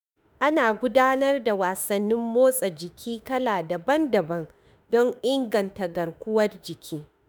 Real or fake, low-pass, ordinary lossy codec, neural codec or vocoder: fake; none; none; autoencoder, 48 kHz, 32 numbers a frame, DAC-VAE, trained on Japanese speech